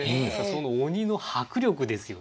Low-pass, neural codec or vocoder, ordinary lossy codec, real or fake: none; none; none; real